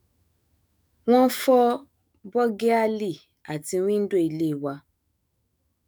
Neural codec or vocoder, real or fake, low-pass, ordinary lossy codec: autoencoder, 48 kHz, 128 numbers a frame, DAC-VAE, trained on Japanese speech; fake; none; none